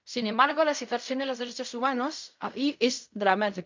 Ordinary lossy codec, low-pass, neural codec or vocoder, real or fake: MP3, 64 kbps; 7.2 kHz; codec, 16 kHz in and 24 kHz out, 0.4 kbps, LongCat-Audio-Codec, fine tuned four codebook decoder; fake